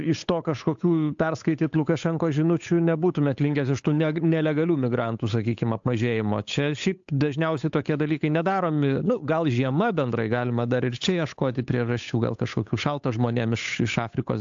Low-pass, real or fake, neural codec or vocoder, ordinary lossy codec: 7.2 kHz; fake; codec, 16 kHz, 8 kbps, FunCodec, trained on Chinese and English, 25 frames a second; AAC, 64 kbps